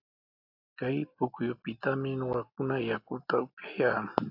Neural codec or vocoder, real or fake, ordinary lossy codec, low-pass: none; real; AAC, 48 kbps; 5.4 kHz